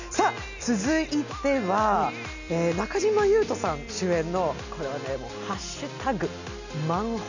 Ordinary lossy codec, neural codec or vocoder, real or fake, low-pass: none; none; real; 7.2 kHz